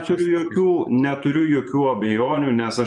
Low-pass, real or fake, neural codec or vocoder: 10.8 kHz; fake; vocoder, 24 kHz, 100 mel bands, Vocos